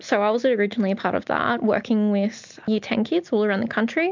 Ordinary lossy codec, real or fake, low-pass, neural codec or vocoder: MP3, 64 kbps; real; 7.2 kHz; none